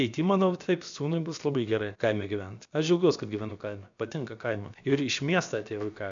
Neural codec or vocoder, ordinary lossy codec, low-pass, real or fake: codec, 16 kHz, about 1 kbps, DyCAST, with the encoder's durations; MP3, 64 kbps; 7.2 kHz; fake